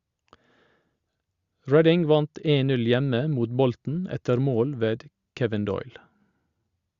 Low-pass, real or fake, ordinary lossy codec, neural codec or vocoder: 7.2 kHz; real; Opus, 64 kbps; none